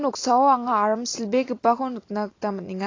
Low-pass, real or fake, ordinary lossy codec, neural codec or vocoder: 7.2 kHz; real; AAC, 32 kbps; none